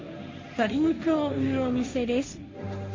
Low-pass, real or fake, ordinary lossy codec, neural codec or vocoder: 7.2 kHz; fake; MP3, 32 kbps; codec, 16 kHz, 1.1 kbps, Voila-Tokenizer